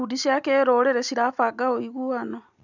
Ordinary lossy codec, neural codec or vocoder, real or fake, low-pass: none; none; real; 7.2 kHz